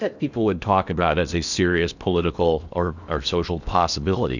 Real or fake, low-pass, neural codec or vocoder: fake; 7.2 kHz; codec, 16 kHz in and 24 kHz out, 0.8 kbps, FocalCodec, streaming, 65536 codes